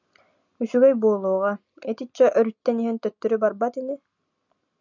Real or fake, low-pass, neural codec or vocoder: real; 7.2 kHz; none